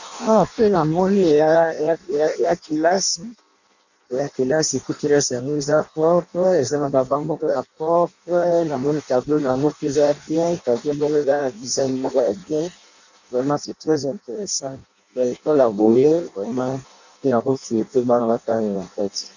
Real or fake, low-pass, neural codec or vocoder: fake; 7.2 kHz; codec, 16 kHz in and 24 kHz out, 0.6 kbps, FireRedTTS-2 codec